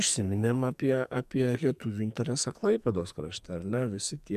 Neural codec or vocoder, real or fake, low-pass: codec, 44.1 kHz, 2.6 kbps, SNAC; fake; 14.4 kHz